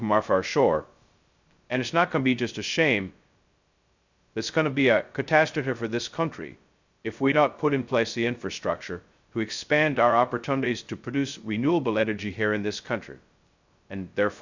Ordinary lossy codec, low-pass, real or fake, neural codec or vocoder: Opus, 64 kbps; 7.2 kHz; fake; codec, 16 kHz, 0.2 kbps, FocalCodec